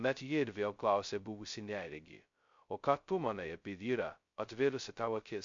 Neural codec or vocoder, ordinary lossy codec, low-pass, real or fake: codec, 16 kHz, 0.2 kbps, FocalCodec; MP3, 48 kbps; 7.2 kHz; fake